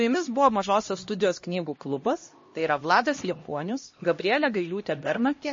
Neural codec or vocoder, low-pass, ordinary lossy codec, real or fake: codec, 16 kHz, 1 kbps, X-Codec, HuBERT features, trained on LibriSpeech; 7.2 kHz; MP3, 32 kbps; fake